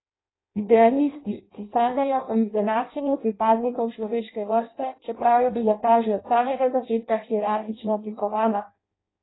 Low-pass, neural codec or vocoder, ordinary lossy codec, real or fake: 7.2 kHz; codec, 16 kHz in and 24 kHz out, 0.6 kbps, FireRedTTS-2 codec; AAC, 16 kbps; fake